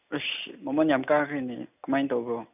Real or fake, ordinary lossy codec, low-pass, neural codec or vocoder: real; none; 3.6 kHz; none